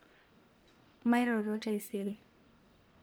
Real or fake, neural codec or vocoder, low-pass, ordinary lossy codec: fake; codec, 44.1 kHz, 1.7 kbps, Pupu-Codec; none; none